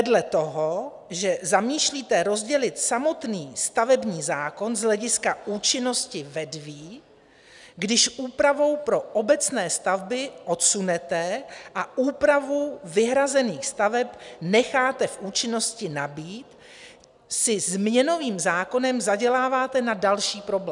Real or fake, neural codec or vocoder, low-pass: real; none; 10.8 kHz